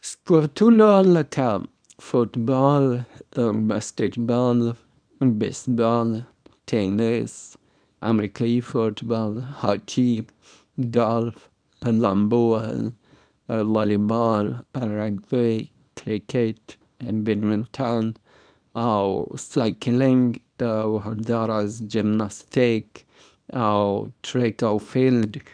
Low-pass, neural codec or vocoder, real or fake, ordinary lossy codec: 9.9 kHz; codec, 24 kHz, 0.9 kbps, WavTokenizer, small release; fake; none